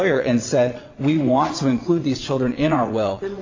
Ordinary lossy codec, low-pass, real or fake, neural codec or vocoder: AAC, 48 kbps; 7.2 kHz; fake; vocoder, 22.05 kHz, 80 mel bands, WaveNeXt